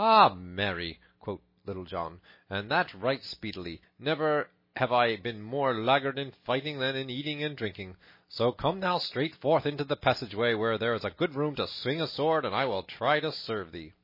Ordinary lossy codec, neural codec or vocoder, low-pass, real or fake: MP3, 24 kbps; none; 5.4 kHz; real